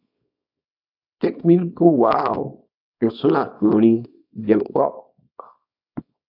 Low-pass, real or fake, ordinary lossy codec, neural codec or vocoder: 5.4 kHz; fake; AAC, 48 kbps; codec, 24 kHz, 0.9 kbps, WavTokenizer, small release